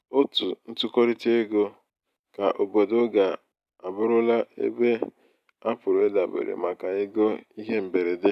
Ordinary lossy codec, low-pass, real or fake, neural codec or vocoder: none; 14.4 kHz; real; none